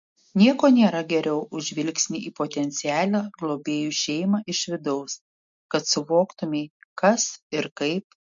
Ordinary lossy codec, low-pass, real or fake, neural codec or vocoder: MP3, 48 kbps; 7.2 kHz; real; none